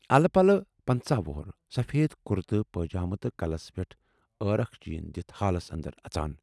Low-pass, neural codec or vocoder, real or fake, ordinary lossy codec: none; none; real; none